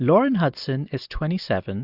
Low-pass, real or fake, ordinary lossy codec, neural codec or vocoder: 5.4 kHz; real; Opus, 64 kbps; none